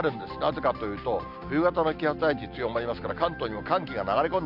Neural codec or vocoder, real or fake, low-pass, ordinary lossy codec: none; real; 5.4 kHz; none